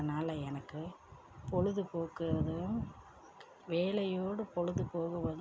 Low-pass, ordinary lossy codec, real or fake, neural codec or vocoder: none; none; real; none